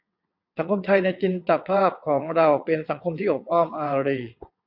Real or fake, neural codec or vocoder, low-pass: fake; vocoder, 22.05 kHz, 80 mel bands, WaveNeXt; 5.4 kHz